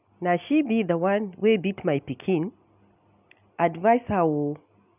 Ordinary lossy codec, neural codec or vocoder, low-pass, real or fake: none; none; 3.6 kHz; real